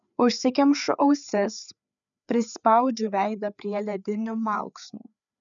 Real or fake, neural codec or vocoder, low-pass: fake; codec, 16 kHz, 4 kbps, FreqCodec, larger model; 7.2 kHz